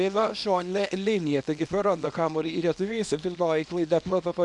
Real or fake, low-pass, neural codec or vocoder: fake; 10.8 kHz; codec, 24 kHz, 0.9 kbps, WavTokenizer, small release